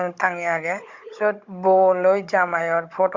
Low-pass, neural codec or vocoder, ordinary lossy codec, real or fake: 7.2 kHz; codec, 16 kHz, 16 kbps, FunCodec, trained on Chinese and English, 50 frames a second; Opus, 64 kbps; fake